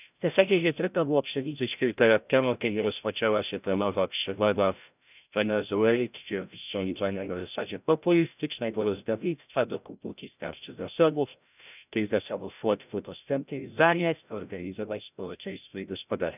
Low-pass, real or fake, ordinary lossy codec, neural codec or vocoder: 3.6 kHz; fake; none; codec, 16 kHz, 0.5 kbps, FreqCodec, larger model